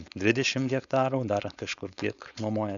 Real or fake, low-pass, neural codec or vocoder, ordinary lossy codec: fake; 7.2 kHz; codec, 16 kHz, 4.8 kbps, FACodec; MP3, 96 kbps